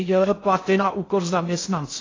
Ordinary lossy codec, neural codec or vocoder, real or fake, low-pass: AAC, 32 kbps; codec, 16 kHz in and 24 kHz out, 0.6 kbps, FocalCodec, streaming, 2048 codes; fake; 7.2 kHz